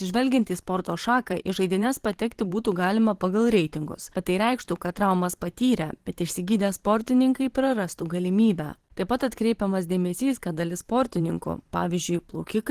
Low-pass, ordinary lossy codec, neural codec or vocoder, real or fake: 14.4 kHz; Opus, 16 kbps; vocoder, 44.1 kHz, 128 mel bands every 512 samples, BigVGAN v2; fake